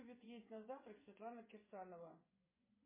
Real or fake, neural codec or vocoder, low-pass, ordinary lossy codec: fake; vocoder, 24 kHz, 100 mel bands, Vocos; 3.6 kHz; MP3, 16 kbps